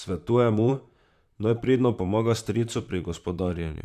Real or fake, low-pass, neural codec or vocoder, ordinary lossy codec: fake; 14.4 kHz; vocoder, 44.1 kHz, 128 mel bands, Pupu-Vocoder; none